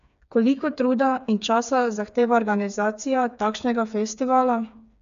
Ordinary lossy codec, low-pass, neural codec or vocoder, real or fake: AAC, 96 kbps; 7.2 kHz; codec, 16 kHz, 4 kbps, FreqCodec, smaller model; fake